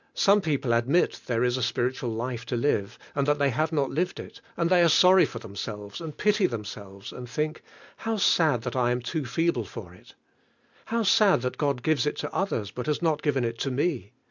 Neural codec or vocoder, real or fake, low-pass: none; real; 7.2 kHz